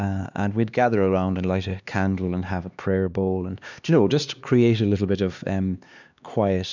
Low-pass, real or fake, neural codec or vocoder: 7.2 kHz; fake; codec, 16 kHz, 2 kbps, X-Codec, HuBERT features, trained on LibriSpeech